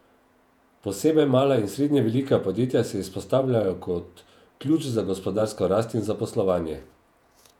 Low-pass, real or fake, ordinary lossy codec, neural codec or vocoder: 19.8 kHz; fake; none; vocoder, 48 kHz, 128 mel bands, Vocos